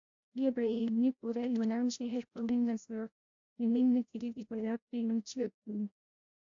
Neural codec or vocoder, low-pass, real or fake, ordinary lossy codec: codec, 16 kHz, 0.5 kbps, FreqCodec, larger model; 7.2 kHz; fake; AAC, 64 kbps